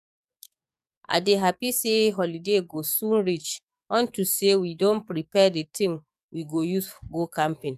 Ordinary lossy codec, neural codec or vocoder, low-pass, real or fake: none; codec, 44.1 kHz, 7.8 kbps, DAC; 14.4 kHz; fake